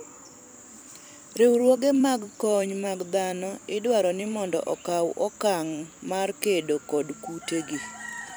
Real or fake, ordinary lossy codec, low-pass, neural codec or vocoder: fake; none; none; vocoder, 44.1 kHz, 128 mel bands every 256 samples, BigVGAN v2